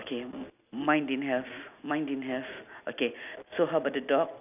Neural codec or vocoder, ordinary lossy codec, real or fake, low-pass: none; none; real; 3.6 kHz